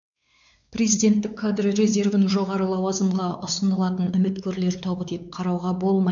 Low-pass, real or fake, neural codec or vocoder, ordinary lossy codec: 7.2 kHz; fake; codec, 16 kHz, 4 kbps, X-Codec, WavLM features, trained on Multilingual LibriSpeech; none